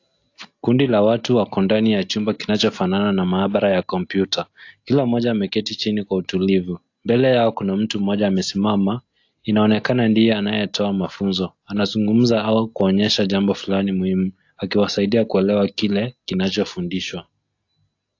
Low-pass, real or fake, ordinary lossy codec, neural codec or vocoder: 7.2 kHz; real; AAC, 48 kbps; none